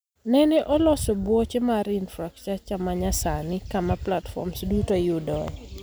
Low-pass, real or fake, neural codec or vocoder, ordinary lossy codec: none; real; none; none